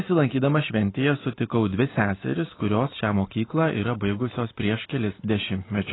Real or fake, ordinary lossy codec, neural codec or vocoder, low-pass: real; AAC, 16 kbps; none; 7.2 kHz